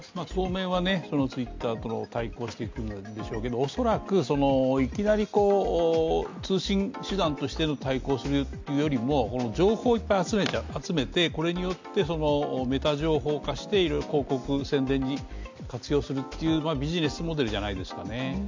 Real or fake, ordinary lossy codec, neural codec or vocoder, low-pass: real; MP3, 64 kbps; none; 7.2 kHz